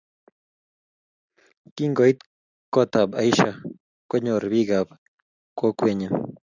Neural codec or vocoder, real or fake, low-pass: none; real; 7.2 kHz